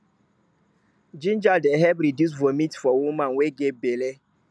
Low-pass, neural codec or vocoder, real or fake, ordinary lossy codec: none; none; real; none